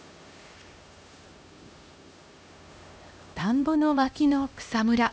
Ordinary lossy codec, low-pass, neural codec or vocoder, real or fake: none; none; codec, 16 kHz, 1 kbps, X-Codec, HuBERT features, trained on LibriSpeech; fake